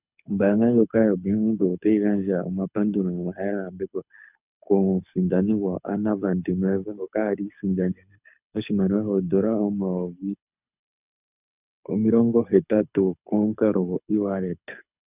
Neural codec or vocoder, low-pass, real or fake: codec, 24 kHz, 6 kbps, HILCodec; 3.6 kHz; fake